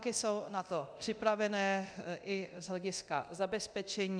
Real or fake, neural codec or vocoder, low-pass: fake; codec, 24 kHz, 0.9 kbps, DualCodec; 9.9 kHz